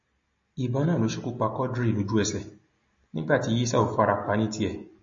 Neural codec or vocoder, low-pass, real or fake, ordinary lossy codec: none; 7.2 kHz; real; MP3, 32 kbps